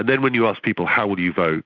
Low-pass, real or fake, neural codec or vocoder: 7.2 kHz; real; none